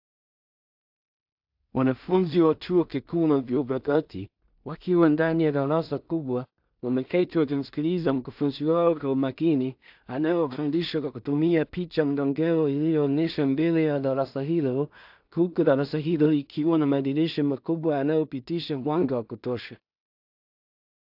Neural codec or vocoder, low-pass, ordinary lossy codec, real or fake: codec, 16 kHz in and 24 kHz out, 0.4 kbps, LongCat-Audio-Codec, two codebook decoder; 5.4 kHz; AAC, 48 kbps; fake